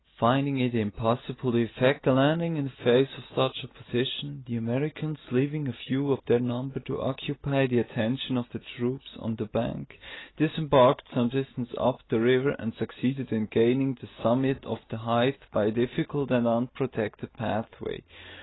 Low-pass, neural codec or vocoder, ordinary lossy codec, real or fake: 7.2 kHz; none; AAC, 16 kbps; real